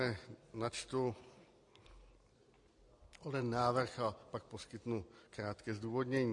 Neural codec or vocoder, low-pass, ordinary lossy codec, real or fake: none; 10.8 kHz; MP3, 48 kbps; real